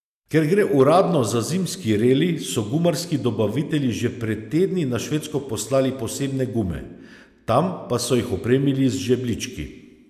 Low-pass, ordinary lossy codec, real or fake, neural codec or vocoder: 14.4 kHz; none; real; none